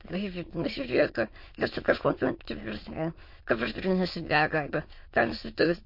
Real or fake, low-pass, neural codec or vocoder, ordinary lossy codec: fake; 5.4 kHz; autoencoder, 22.05 kHz, a latent of 192 numbers a frame, VITS, trained on many speakers; MP3, 32 kbps